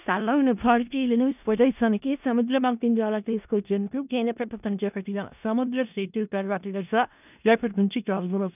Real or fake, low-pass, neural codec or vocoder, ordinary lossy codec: fake; 3.6 kHz; codec, 16 kHz in and 24 kHz out, 0.4 kbps, LongCat-Audio-Codec, four codebook decoder; none